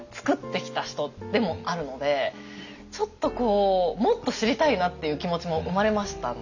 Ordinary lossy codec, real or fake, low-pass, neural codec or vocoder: none; real; 7.2 kHz; none